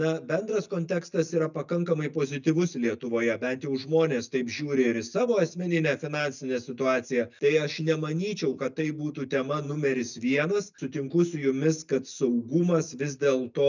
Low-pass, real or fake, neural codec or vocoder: 7.2 kHz; real; none